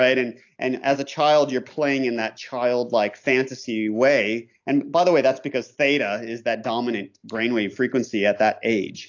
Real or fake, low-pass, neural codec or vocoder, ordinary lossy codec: real; 7.2 kHz; none; AAC, 48 kbps